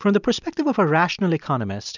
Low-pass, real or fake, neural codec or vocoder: 7.2 kHz; real; none